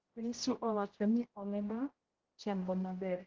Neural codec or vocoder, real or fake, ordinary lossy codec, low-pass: codec, 16 kHz, 0.5 kbps, X-Codec, HuBERT features, trained on general audio; fake; Opus, 16 kbps; 7.2 kHz